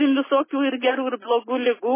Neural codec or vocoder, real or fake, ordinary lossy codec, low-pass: none; real; MP3, 16 kbps; 3.6 kHz